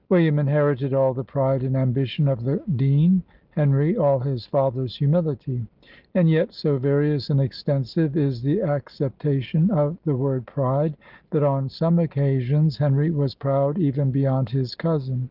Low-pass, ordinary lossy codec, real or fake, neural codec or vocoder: 5.4 kHz; Opus, 24 kbps; real; none